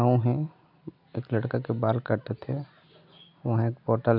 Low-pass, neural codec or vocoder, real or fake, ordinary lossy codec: 5.4 kHz; none; real; MP3, 48 kbps